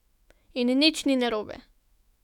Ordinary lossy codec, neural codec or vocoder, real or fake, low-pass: none; autoencoder, 48 kHz, 128 numbers a frame, DAC-VAE, trained on Japanese speech; fake; 19.8 kHz